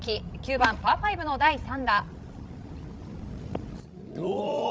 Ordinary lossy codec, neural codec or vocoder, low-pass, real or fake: none; codec, 16 kHz, 16 kbps, FreqCodec, larger model; none; fake